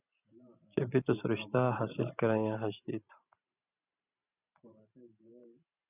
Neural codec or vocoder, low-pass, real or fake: vocoder, 44.1 kHz, 128 mel bands every 512 samples, BigVGAN v2; 3.6 kHz; fake